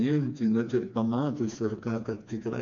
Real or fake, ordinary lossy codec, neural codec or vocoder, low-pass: fake; AAC, 64 kbps; codec, 16 kHz, 2 kbps, FreqCodec, smaller model; 7.2 kHz